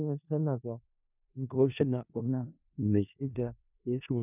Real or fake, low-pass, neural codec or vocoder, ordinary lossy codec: fake; 3.6 kHz; codec, 16 kHz in and 24 kHz out, 0.4 kbps, LongCat-Audio-Codec, four codebook decoder; none